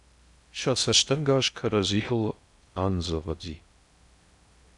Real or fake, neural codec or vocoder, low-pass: fake; codec, 16 kHz in and 24 kHz out, 0.6 kbps, FocalCodec, streaming, 2048 codes; 10.8 kHz